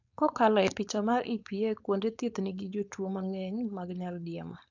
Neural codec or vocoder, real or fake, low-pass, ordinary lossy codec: codec, 16 kHz, 4.8 kbps, FACodec; fake; 7.2 kHz; none